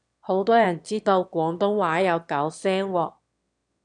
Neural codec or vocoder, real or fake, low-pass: autoencoder, 22.05 kHz, a latent of 192 numbers a frame, VITS, trained on one speaker; fake; 9.9 kHz